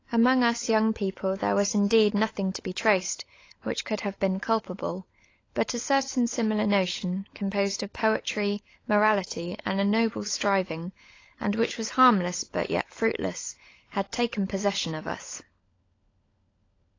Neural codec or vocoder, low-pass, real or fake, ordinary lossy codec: codec, 16 kHz, 16 kbps, FunCodec, trained on LibriTTS, 50 frames a second; 7.2 kHz; fake; AAC, 32 kbps